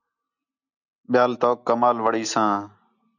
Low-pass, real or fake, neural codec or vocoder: 7.2 kHz; real; none